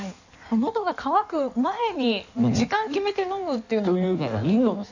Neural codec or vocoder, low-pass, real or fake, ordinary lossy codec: codec, 16 kHz in and 24 kHz out, 1.1 kbps, FireRedTTS-2 codec; 7.2 kHz; fake; none